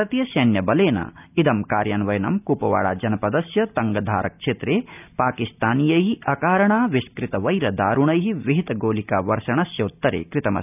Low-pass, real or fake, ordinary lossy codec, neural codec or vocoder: 3.6 kHz; real; none; none